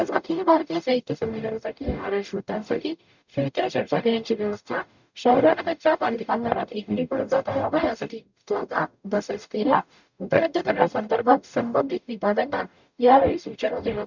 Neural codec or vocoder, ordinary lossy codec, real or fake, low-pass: codec, 44.1 kHz, 0.9 kbps, DAC; none; fake; 7.2 kHz